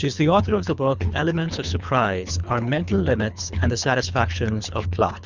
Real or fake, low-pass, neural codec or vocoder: fake; 7.2 kHz; codec, 24 kHz, 3 kbps, HILCodec